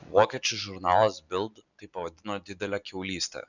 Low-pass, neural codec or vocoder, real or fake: 7.2 kHz; none; real